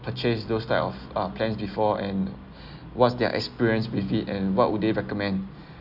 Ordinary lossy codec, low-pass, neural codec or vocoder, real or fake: none; 5.4 kHz; none; real